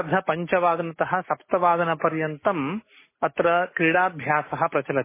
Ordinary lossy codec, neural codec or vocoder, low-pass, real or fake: MP3, 16 kbps; none; 3.6 kHz; real